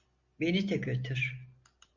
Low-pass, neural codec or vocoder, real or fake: 7.2 kHz; none; real